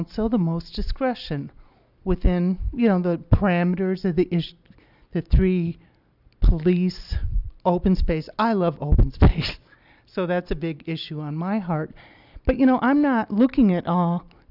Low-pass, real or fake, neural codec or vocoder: 5.4 kHz; real; none